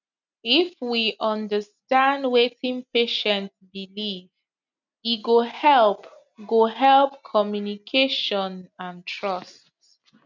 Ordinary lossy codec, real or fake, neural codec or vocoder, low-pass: none; real; none; 7.2 kHz